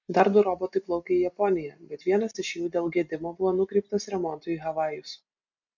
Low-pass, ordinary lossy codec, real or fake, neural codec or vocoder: 7.2 kHz; MP3, 64 kbps; real; none